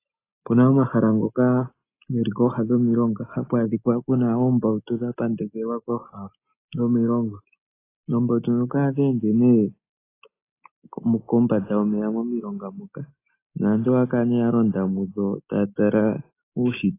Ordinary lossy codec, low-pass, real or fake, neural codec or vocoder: AAC, 24 kbps; 3.6 kHz; real; none